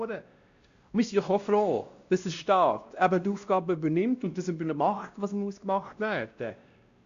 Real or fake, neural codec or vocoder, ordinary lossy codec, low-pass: fake; codec, 16 kHz, 1 kbps, X-Codec, WavLM features, trained on Multilingual LibriSpeech; Opus, 64 kbps; 7.2 kHz